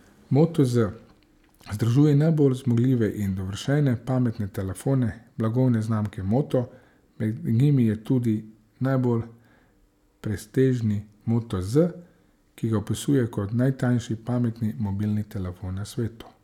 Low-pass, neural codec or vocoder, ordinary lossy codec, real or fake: 19.8 kHz; none; none; real